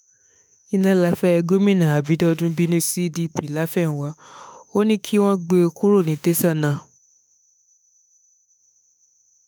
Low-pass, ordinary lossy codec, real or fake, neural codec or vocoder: none; none; fake; autoencoder, 48 kHz, 32 numbers a frame, DAC-VAE, trained on Japanese speech